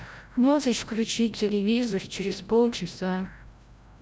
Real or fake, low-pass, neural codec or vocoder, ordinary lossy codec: fake; none; codec, 16 kHz, 0.5 kbps, FreqCodec, larger model; none